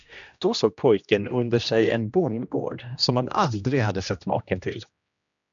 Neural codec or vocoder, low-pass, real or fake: codec, 16 kHz, 1 kbps, X-Codec, HuBERT features, trained on general audio; 7.2 kHz; fake